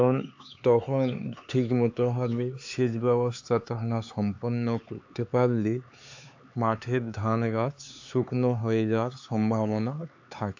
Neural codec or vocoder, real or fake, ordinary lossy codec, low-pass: codec, 16 kHz, 4 kbps, X-Codec, HuBERT features, trained on LibriSpeech; fake; AAC, 48 kbps; 7.2 kHz